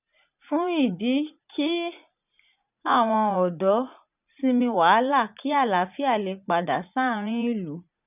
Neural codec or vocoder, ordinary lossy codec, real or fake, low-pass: vocoder, 44.1 kHz, 80 mel bands, Vocos; none; fake; 3.6 kHz